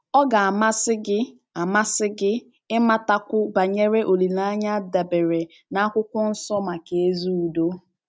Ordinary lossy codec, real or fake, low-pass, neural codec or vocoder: none; real; none; none